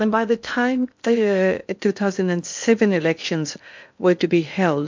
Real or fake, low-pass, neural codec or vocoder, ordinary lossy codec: fake; 7.2 kHz; codec, 16 kHz in and 24 kHz out, 0.6 kbps, FocalCodec, streaming, 2048 codes; MP3, 64 kbps